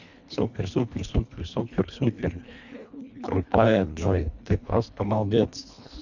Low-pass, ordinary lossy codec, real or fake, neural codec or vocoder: 7.2 kHz; none; fake; codec, 24 kHz, 1.5 kbps, HILCodec